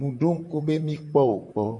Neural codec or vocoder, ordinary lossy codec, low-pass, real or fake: vocoder, 44.1 kHz, 128 mel bands, Pupu-Vocoder; MP3, 64 kbps; 10.8 kHz; fake